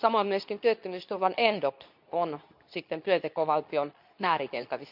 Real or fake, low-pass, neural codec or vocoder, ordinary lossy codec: fake; 5.4 kHz; codec, 24 kHz, 0.9 kbps, WavTokenizer, medium speech release version 2; none